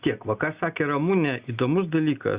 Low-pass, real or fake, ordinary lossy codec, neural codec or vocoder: 3.6 kHz; real; Opus, 64 kbps; none